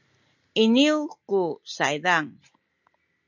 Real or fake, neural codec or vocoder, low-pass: real; none; 7.2 kHz